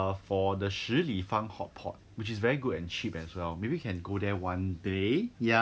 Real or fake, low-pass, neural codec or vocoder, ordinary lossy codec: real; none; none; none